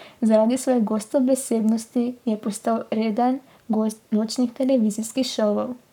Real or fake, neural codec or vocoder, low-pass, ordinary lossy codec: fake; codec, 44.1 kHz, 7.8 kbps, Pupu-Codec; 19.8 kHz; none